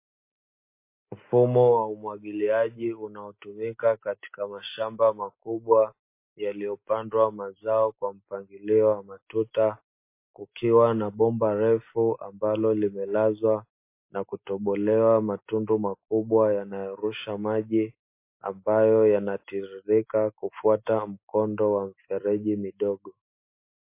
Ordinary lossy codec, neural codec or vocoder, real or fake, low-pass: MP3, 24 kbps; none; real; 3.6 kHz